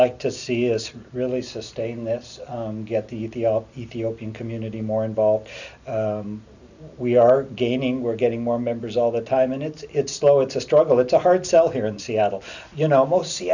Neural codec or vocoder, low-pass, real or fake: none; 7.2 kHz; real